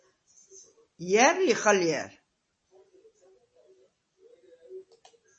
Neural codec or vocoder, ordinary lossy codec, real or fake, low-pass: none; MP3, 32 kbps; real; 9.9 kHz